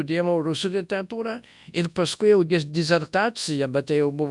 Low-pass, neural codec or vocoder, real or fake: 10.8 kHz; codec, 24 kHz, 0.9 kbps, WavTokenizer, large speech release; fake